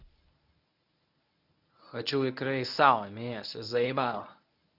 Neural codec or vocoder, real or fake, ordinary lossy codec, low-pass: codec, 24 kHz, 0.9 kbps, WavTokenizer, medium speech release version 1; fake; none; 5.4 kHz